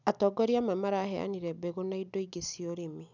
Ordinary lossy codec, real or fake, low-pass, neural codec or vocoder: none; real; 7.2 kHz; none